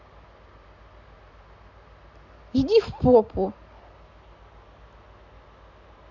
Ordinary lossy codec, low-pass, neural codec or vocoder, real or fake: none; 7.2 kHz; none; real